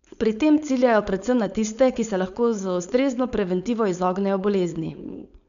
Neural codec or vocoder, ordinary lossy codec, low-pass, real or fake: codec, 16 kHz, 4.8 kbps, FACodec; none; 7.2 kHz; fake